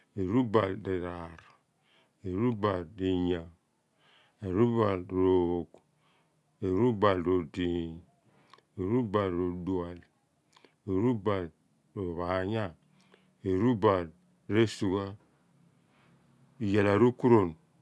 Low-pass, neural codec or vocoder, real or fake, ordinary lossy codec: none; none; real; none